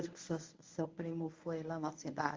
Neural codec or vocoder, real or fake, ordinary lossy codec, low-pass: codec, 24 kHz, 0.9 kbps, WavTokenizer, medium speech release version 1; fake; Opus, 32 kbps; 7.2 kHz